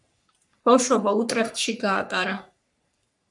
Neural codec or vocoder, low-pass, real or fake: codec, 44.1 kHz, 3.4 kbps, Pupu-Codec; 10.8 kHz; fake